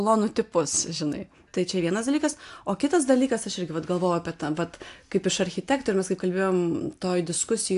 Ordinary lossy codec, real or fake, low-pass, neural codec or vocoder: AAC, 64 kbps; real; 10.8 kHz; none